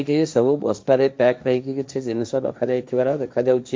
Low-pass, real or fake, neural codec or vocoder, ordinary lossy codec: none; fake; codec, 16 kHz, 1.1 kbps, Voila-Tokenizer; none